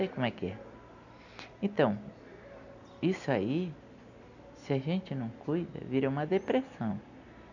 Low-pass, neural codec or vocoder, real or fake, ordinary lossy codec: 7.2 kHz; none; real; none